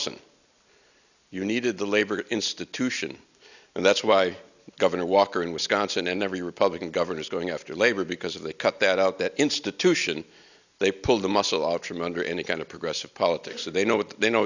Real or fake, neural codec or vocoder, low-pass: real; none; 7.2 kHz